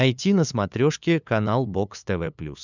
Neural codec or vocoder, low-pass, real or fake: codec, 16 kHz, 8 kbps, FunCodec, trained on LibriTTS, 25 frames a second; 7.2 kHz; fake